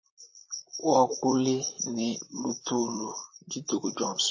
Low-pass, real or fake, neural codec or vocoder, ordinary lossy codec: 7.2 kHz; fake; vocoder, 44.1 kHz, 128 mel bands, Pupu-Vocoder; MP3, 32 kbps